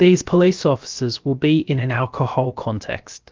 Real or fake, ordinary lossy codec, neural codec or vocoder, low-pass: fake; Opus, 32 kbps; codec, 16 kHz, 0.7 kbps, FocalCodec; 7.2 kHz